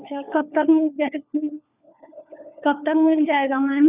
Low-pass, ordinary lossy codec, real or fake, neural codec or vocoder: 3.6 kHz; none; fake; codec, 16 kHz, 4 kbps, FunCodec, trained on LibriTTS, 50 frames a second